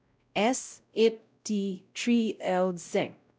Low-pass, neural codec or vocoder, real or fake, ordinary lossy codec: none; codec, 16 kHz, 0.5 kbps, X-Codec, WavLM features, trained on Multilingual LibriSpeech; fake; none